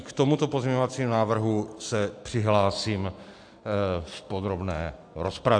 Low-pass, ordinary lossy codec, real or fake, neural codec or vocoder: 9.9 kHz; AAC, 64 kbps; real; none